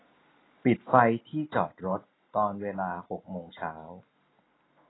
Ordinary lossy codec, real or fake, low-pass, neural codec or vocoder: AAC, 16 kbps; real; 7.2 kHz; none